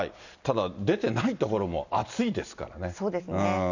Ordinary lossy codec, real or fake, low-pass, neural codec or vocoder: none; real; 7.2 kHz; none